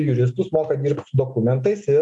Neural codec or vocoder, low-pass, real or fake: none; 10.8 kHz; real